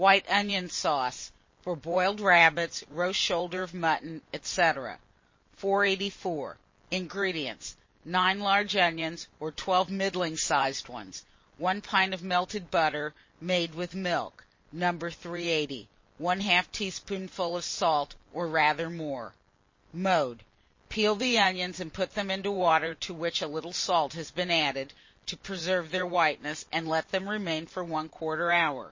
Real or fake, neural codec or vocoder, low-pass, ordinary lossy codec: fake; vocoder, 44.1 kHz, 128 mel bands every 512 samples, BigVGAN v2; 7.2 kHz; MP3, 32 kbps